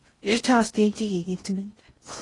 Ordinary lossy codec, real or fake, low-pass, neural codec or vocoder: AAC, 32 kbps; fake; 10.8 kHz; codec, 16 kHz in and 24 kHz out, 0.6 kbps, FocalCodec, streaming, 4096 codes